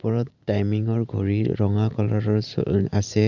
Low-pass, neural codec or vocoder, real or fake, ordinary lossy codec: 7.2 kHz; vocoder, 44.1 kHz, 128 mel bands every 512 samples, BigVGAN v2; fake; none